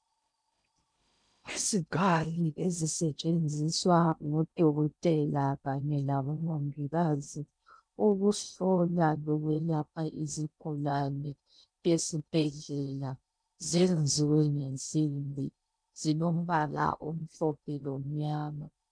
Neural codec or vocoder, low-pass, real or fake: codec, 16 kHz in and 24 kHz out, 0.6 kbps, FocalCodec, streaming, 2048 codes; 9.9 kHz; fake